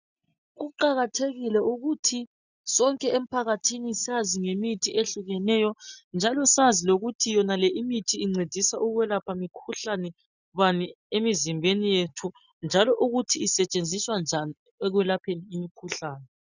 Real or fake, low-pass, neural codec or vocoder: real; 7.2 kHz; none